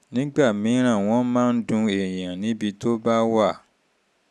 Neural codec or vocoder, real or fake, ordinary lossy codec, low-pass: none; real; none; none